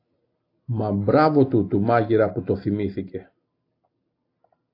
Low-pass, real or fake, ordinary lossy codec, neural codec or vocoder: 5.4 kHz; real; AAC, 32 kbps; none